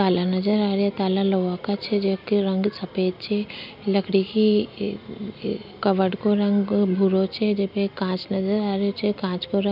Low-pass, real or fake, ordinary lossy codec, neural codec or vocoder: 5.4 kHz; real; none; none